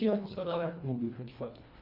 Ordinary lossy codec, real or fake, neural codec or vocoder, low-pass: MP3, 48 kbps; fake; codec, 24 kHz, 1.5 kbps, HILCodec; 5.4 kHz